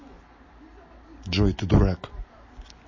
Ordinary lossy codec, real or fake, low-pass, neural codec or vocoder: MP3, 32 kbps; real; 7.2 kHz; none